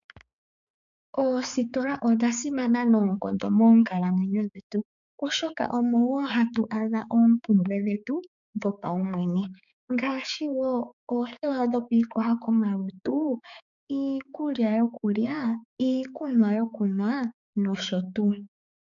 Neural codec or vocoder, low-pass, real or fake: codec, 16 kHz, 4 kbps, X-Codec, HuBERT features, trained on balanced general audio; 7.2 kHz; fake